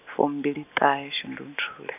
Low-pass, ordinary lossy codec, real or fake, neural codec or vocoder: 3.6 kHz; none; real; none